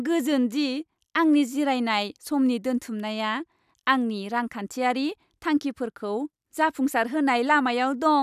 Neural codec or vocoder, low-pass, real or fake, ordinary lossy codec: none; 14.4 kHz; real; none